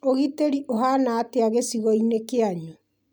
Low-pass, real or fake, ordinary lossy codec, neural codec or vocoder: none; real; none; none